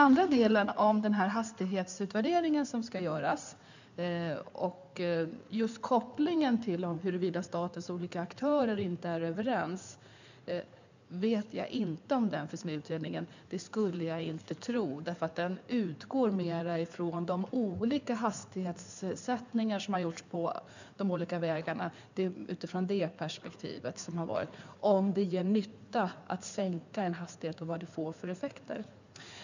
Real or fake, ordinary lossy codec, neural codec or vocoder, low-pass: fake; none; codec, 16 kHz in and 24 kHz out, 2.2 kbps, FireRedTTS-2 codec; 7.2 kHz